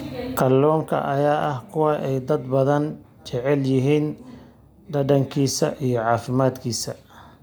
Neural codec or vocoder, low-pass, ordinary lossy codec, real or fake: none; none; none; real